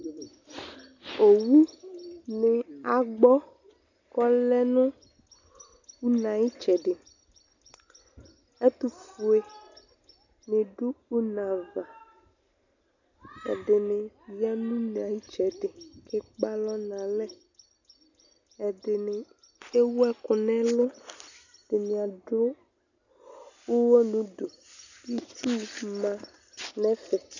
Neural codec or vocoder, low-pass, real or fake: none; 7.2 kHz; real